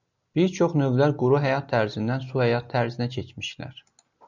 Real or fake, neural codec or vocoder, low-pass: real; none; 7.2 kHz